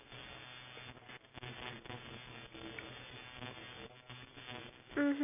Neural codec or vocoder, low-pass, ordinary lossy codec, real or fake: none; 3.6 kHz; none; real